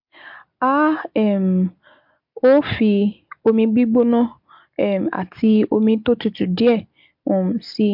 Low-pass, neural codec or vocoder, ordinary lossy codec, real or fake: 5.4 kHz; none; MP3, 48 kbps; real